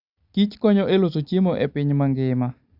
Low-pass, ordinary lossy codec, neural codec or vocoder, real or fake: 5.4 kHz; Opus, 64 kbps; none; real